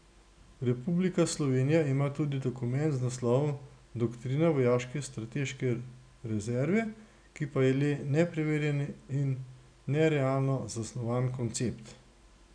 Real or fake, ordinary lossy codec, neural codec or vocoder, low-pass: real; none; none; 9.9 kHz